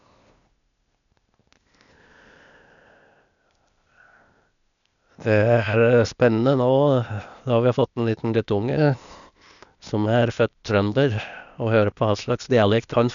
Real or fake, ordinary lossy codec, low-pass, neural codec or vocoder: fake; none; 7.2 kHz; codec, 16 kHz, 0.8 kbps, ZipCodec